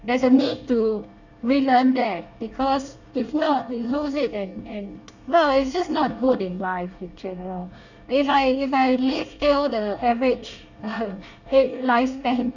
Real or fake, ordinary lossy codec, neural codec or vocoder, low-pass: fake; none; codec, 24 kHz, 1 kbps, SNAC; 7.2 kHz